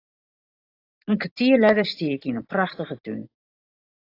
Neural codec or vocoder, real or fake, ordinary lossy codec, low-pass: vocoder, 44.1 kHz, 128 mel bands every 512 samples, BigVGAN v2; fake; AAC, 32 kbps; 5.4 kHz